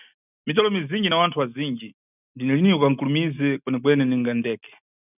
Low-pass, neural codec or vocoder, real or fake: 3.6 kHz; none; real